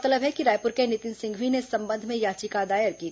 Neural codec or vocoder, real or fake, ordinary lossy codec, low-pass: none; real; none; none